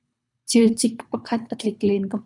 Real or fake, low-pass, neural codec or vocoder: fake; 10.8 kHz; codec, 24 kHz, 3 kbps, HILCodec